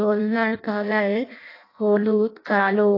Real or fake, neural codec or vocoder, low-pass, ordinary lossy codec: fake; codec, 16 kHz in and 24 kHz out, 0.6 kbps, FireRedTTS-2 codec; 5.4 kHz; AAC, 32 kbps